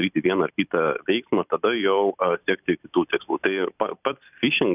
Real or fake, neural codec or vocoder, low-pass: fake; vocoder, 44.1 kHz, 128 mel bands every 256 samples, BigVGAN v2; 3.6 kHz